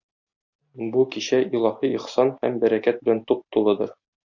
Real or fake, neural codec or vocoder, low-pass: real; none; 7.2 kHz